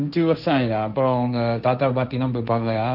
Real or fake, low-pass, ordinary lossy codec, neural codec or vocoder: fake; 5.4 kHz; none; codec, 16 kHz, 1.1 kbps, Voila-Tokenizer